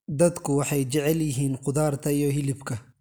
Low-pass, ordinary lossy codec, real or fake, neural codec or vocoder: none; none; real; none